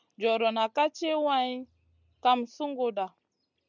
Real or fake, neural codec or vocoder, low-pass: real; none; 7.2 kHz